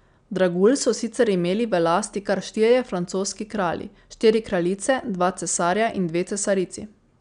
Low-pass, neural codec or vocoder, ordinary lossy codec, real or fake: 9.9 kHz; none; none; real